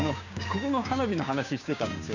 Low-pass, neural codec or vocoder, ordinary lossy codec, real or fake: 7.2 kHz; codec, 16 kHz, 6 kbps, DAC; none; fake